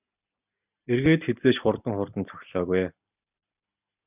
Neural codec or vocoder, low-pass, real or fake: none; 3.6 kHz; real